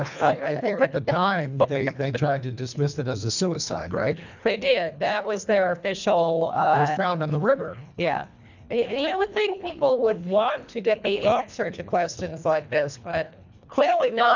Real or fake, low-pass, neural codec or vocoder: fake; 7.2 kHz; codec, 24 kHz, 1.5 kbps, HILCodec